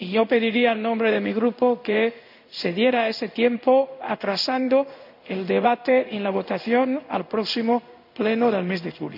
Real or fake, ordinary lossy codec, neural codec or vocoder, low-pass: fake; none; codec, 16 kHz in and 24 kHz out, 1 kbps, XY-Tokenizer; 5.4 kHz